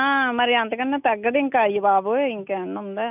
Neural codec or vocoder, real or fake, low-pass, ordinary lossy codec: none; real; 3.6 kHz; none